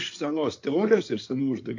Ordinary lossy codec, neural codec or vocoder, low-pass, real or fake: AAC, 48 kbps; codec, 16 kHz in and 24 kHz out, 2.2 kbps, FireRedTTS-2 codec; 7.2 kHz; fake